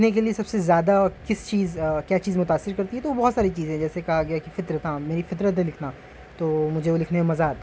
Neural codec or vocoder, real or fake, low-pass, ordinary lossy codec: none; real; none; none